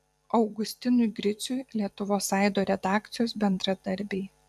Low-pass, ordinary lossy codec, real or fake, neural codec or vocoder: 14.4 kHz; AAC, 96 kbps; fake; vocoder, 44.1 kHz, 128 mel bands every 256 samples, BigVGAN v2